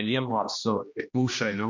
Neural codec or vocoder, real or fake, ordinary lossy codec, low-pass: codec, 16 kHz, 1 kbps, X-Codec, HuBERT features, trained on general audio; fake; MP3, 48 kbps; 7.2 kHz